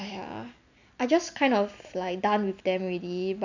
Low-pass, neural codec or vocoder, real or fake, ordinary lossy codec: 7.2 kHz; none; real; none